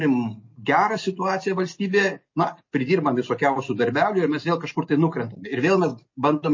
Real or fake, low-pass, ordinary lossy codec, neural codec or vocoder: real; 7.2 kHz; MP3, 32 kbps; none